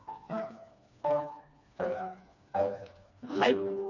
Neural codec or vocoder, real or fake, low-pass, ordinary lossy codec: codec, 16 kHz, 2 kbps, FreqCodec, smaller model; fake; 7.2 kHz; none